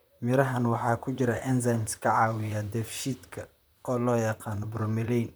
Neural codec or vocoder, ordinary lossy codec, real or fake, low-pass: vocoder, 44.1 kHz, 128 mel bands, Pupu-Vocoder; none; fake; none